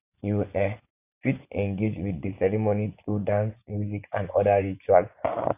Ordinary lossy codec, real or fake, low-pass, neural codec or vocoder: none; fake; 3.6 kHz; vocoder, 24 kHz, 100 mel bands, Vocos